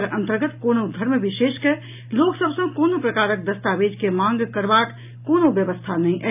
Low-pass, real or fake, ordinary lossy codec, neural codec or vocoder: 3.6 kHz; real; AAC, 32 kbps; none